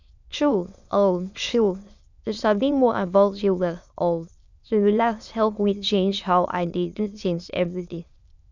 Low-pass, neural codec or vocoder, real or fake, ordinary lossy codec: 7.2 kHz; autoencoder, 22.05 kHz, a latent of 192 numbers a frame, VITS, trained on many speakers; fake; none